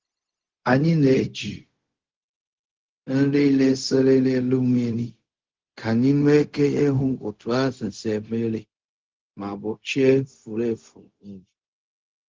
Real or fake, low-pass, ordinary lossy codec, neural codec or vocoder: fake; 7.2 kHz; Opus, 16 kbps; codec, 16 kHz, 0.4 kbps, LongCat-Audio-Codec